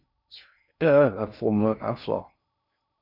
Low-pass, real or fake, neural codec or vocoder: 5.4 kHz; fake; codec, 16 kHz in and 24 kHz out, 0.6 kbps, FocalCodec, streaming, 2048 codes